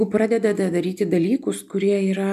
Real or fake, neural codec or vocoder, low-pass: real; none; 14.4 kHz